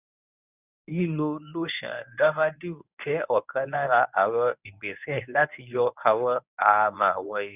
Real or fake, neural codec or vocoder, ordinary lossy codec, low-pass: fake; codec, 24 kHz, 0.9 kbps, WavTokenizer, medium speech release version 1; none; 3.6 kHz